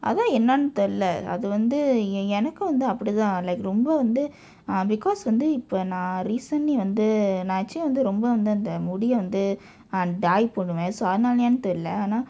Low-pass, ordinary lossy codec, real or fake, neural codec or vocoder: none; none; real; none